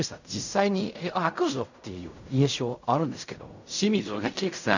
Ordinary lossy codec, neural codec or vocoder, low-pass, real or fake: none; codec, 16 kHz in and 24 kHz out, 0.4 kbps, LongCat-Audio-Codec, fine tuned four codebook decoder; 7.2 kHz; fake